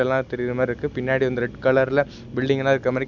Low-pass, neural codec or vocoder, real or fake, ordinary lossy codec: 7.2 kHz; none; real; none